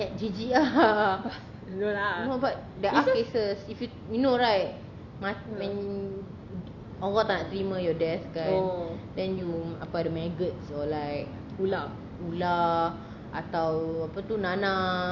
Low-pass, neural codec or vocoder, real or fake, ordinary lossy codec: 7.2 kHz; none; real; none